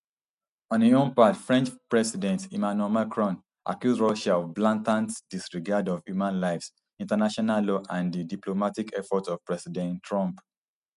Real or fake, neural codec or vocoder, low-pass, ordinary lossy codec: real; none; 10.8 kHz; none